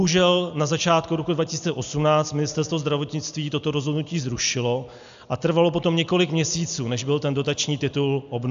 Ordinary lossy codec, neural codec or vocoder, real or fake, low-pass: AAC, 96 kbps; none; real; 7.2 kHz